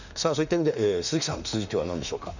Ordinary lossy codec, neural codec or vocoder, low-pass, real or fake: none; autoencoder, 48 kHz, 32 numbers a frame, DAC-VAE, trained on Japanese speech; 7.2 kHz; fake